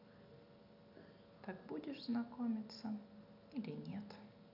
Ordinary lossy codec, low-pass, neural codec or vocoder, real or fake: none; 5.4 kHz; none; real